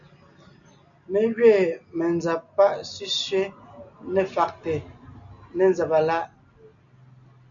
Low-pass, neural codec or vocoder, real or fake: 7.2 kHz; none; real